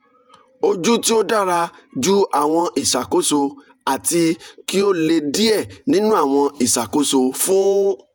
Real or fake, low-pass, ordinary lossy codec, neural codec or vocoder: fake; 19.8 kHz; none; vocoder, 48 kHz, 128 mel bands, Vocos